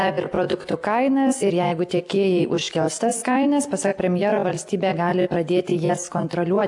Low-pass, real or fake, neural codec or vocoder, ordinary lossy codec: 10.8 kHz; real; none; AAC, 64 kbps